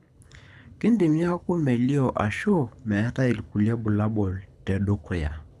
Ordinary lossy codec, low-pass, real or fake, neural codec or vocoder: none; none; fake; codec, 24 kHz, 6 kbps, HILCodec